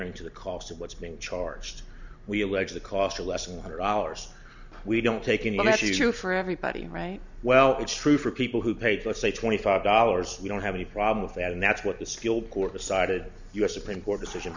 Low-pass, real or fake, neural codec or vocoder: 7.2 kHz; real; none